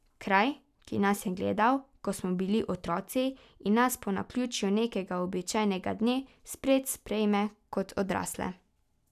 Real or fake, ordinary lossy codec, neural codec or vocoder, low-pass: real; none; none; 14.4 kHz